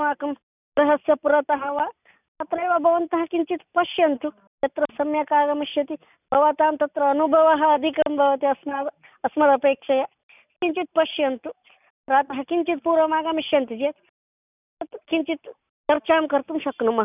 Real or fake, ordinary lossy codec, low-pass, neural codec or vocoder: real; none; 3.6 kHz; none